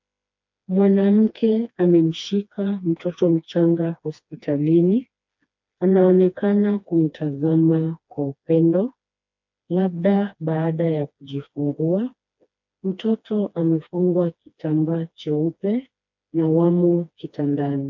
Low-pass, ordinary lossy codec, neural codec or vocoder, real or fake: 7.2 kHz; MP3, 48 kbps; codec, 16 kHz, 2 kbps, FreqCodec, smaller model; fake